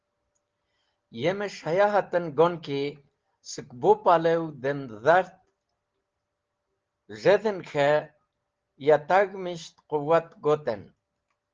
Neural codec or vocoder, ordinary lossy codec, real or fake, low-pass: none; Opus, 16 kbps; real; 7.2 kHz